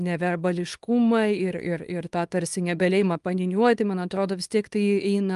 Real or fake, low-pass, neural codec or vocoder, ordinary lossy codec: fake; 10.8 kHz; codec, 24 kHz, 0.9 kbps, WavTokenizer, small release; Opus, 32 kbps